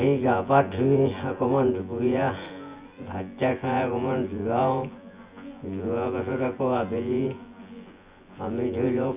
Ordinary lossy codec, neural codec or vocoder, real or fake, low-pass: Opus, 64 kbps; vocoder, 24 kHz, 100 mel bands, Vocos; fake; 3.6 kHz